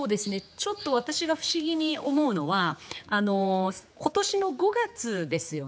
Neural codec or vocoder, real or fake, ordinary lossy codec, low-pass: codec, 16 kHz, 4 kbps, X-Codec, HuBERT features, trained on general audio; fake; none; none